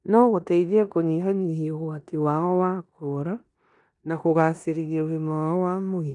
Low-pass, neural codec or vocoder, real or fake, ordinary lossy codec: 10.8 kHz; codec, 16 kHz in and 24 kHz out, 0.9 kbps, LongCat-Audio-Codec, four codebook decoder; fake; none